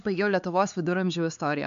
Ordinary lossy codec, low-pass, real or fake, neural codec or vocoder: MP3, 64 kbps; 7.2 kHz; fake; codec, 16 kHz, 4 kbps, X-Codec, HuBERT features, trained on LibriSpeech